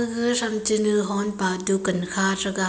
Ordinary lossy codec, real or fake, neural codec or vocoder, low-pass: none; real; none; none